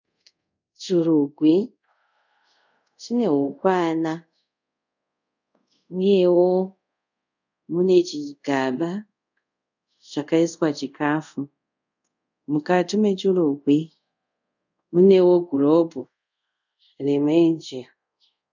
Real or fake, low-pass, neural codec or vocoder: fake; 7.2 kHz; codec, 24 kHz, 0.5 kbps, DualCodec